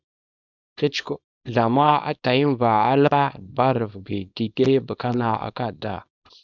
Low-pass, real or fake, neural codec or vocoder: 7.2 kHz; fake; codec, 24 kHz, 0.9 kbps, WavTokenizer, small release